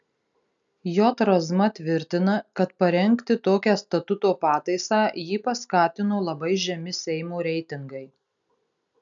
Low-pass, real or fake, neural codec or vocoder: 7.2 kHz; real; none